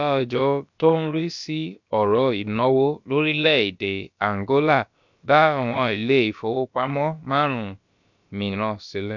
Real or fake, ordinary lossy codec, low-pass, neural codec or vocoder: fake; MP3, 64 kbps; 7.2 kHz; codec, 16 kHz, about 1 kbps, DyCAST, with the encoder's durations